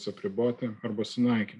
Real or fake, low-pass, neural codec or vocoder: real; 10.8 kHz; none